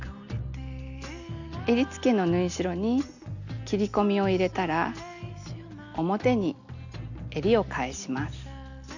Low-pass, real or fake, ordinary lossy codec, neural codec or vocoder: 7.2 kHz; real; none; none